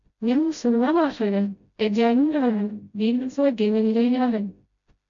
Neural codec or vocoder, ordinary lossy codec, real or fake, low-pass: codec, 16 kHz, 0.5 kbps, FreqCodec, smaller model; MP3, 64 kbps; fake; 7.2 kHz